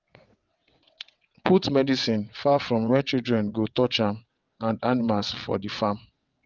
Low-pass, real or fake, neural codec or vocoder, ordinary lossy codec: 7.2 kHz; fake; vocoder, 22.05 kHz, 80 mel bands, WaveNeXt; Opus, 24 kbps